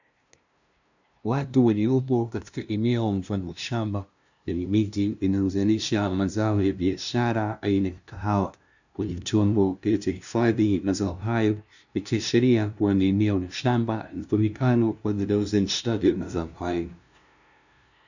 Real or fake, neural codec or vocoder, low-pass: fake; codec, 16 kHz, 0.5 kbps, FunCodec, trained on LibriTTS, 25 frames a second; 7.2 kHz